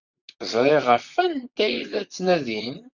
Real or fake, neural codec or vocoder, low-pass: fake; vocoder, 44.1 kHz, 128 mel bands, Pupu-Vocoder; 7.2 kHz